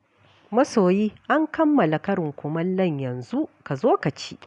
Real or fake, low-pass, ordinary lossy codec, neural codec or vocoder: real; 10.8 kHz; none; none